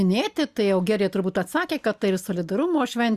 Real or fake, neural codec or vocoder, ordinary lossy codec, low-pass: real; none; Opus, 64 kbps; 14.4 kHz